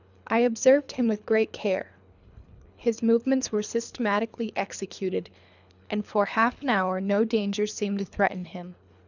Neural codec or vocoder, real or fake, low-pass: codec, 24 kHz, 3 kbps, HILCodec; fake; 7.2 kHz